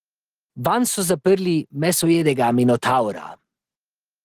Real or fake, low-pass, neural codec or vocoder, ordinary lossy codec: real; 14.4 kHz; none; Opus, 16 kbps